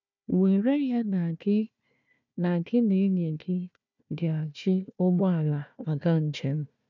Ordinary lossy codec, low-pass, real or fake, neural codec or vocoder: none; 7.2 kHz; fake; codec, 16 kHz, 1 kbps, FunCodec, trained on Chinese and English, 50 frames a second